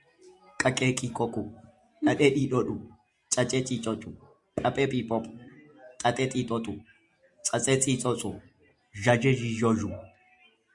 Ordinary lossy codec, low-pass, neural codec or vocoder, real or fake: Opus, 64 kbps; 10.8 kHz; none; real